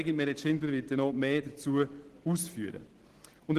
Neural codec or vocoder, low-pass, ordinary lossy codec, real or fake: none; 14.4 kHz; Opus, 16 kbps; real